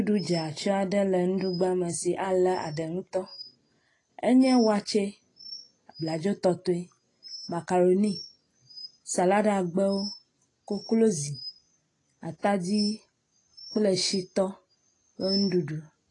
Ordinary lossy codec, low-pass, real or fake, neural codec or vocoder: AAC, 32 kbps; 10.8 kHz; real; none